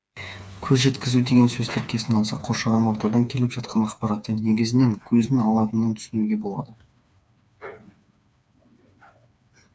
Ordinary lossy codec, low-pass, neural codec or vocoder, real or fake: none; none; codec, 16 kHz, 4 kbps, FreqCodec, smaller model; fake